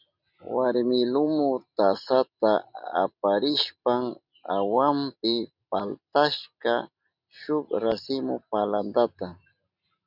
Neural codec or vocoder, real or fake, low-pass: none; real; 5.4 kHz